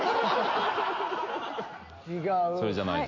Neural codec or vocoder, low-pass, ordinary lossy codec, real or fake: none; 7.2 kHz; MP3, 48 kbps; real